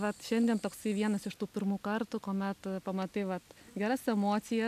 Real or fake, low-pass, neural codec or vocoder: real; 14.4 kHz; none